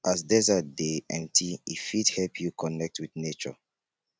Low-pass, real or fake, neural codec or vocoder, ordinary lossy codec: none; real; none; none